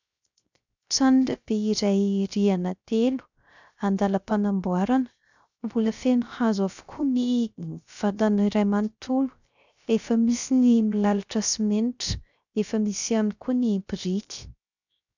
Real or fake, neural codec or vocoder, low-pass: fake; codec, 16 kHz, 0.3 kbps, FocalCodec; 7.2 kHz